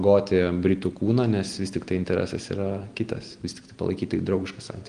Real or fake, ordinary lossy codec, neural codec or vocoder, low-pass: real; Opus, 24 kbps; none; 9.9 kHz